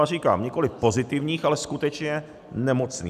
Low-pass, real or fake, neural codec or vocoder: 14.4 kHz; real; none